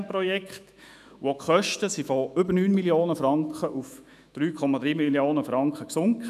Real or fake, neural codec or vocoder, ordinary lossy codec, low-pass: fake; autoencoder, 48 kHz, 128 numbers a frame, DAC-VAE, trained on Japanese speech; none; 14.4 kHz